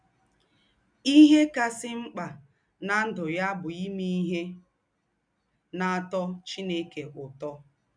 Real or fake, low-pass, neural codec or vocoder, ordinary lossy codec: real; 9.9 kHz; none; none